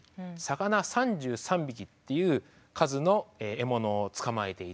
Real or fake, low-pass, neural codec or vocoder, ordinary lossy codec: real; none; none; none